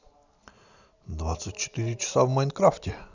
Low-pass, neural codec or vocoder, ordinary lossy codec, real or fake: 7.2 kHz; none; none; real